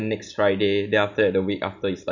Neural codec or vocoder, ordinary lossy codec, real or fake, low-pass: none; none; real; 7.2 kHz